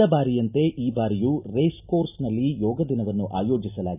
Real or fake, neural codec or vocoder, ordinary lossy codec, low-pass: real; none; none; 3.6 kHz